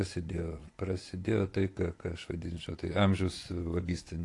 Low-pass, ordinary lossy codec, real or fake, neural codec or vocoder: 10.8 kHz; AAC, 48 kbps; fake; vocoder, 24 kHz, 100 mel bands, Vocos